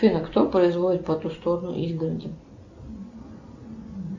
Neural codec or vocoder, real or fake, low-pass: none; real; 7.2 kHz